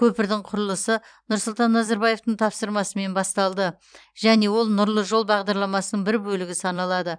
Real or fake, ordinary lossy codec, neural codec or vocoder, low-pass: fake; none; vocoder, 44.1 kHz, 128 mel bands every 256 samples, BigVGAN v2; 9.9 kHz